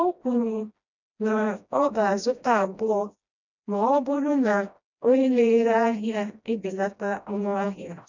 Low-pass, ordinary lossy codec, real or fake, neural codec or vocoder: 7.2 kHz; none; fake; codec, 16 kHz, 1 kbps, FreqCodec, smaller model